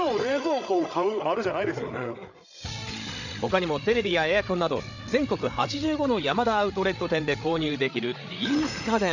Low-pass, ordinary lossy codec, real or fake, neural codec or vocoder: 7.2 kHz; none; fake; codec, 16 kHz, 8 kbps, FreqCodec, larger model